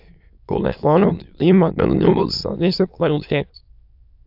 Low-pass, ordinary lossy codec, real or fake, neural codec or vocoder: 5.4 kHz; AAC, 48 kbps; fake; autoencoder, 22.05 kHz, a latent of 192 numbers a frame, VITS, trained on many speakers